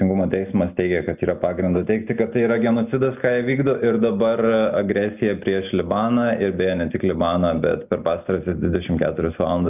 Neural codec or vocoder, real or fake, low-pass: none; real; 3.6 kHz